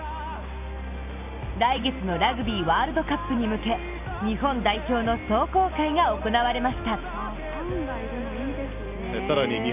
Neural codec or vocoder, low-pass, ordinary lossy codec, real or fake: none; 3.6 kHz; none; real